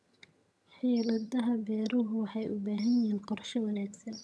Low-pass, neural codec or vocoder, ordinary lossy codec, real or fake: none; vocoder, 22.05 kHz, 80 mel bands, HiFi-GAN; none; fake